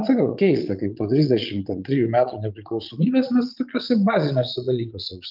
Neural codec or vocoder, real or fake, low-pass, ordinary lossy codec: vocoder, 22.05 kHz, 80 mel bands, Vocos; fake; 5.4 kHz; Opus, 24 kbps